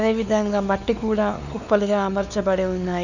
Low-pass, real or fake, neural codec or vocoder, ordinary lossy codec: 7.2 kHz; fake; codec, 16 kHz, 4 kbps, X-Codec, WavLM features, trained on Multilingual LibriSpeech; none